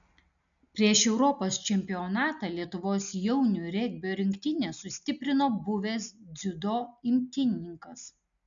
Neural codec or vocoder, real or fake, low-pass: none; real; 7.2 kHz